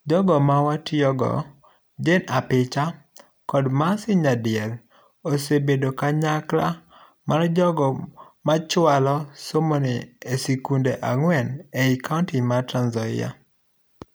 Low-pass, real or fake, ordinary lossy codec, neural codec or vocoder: none; real; none; none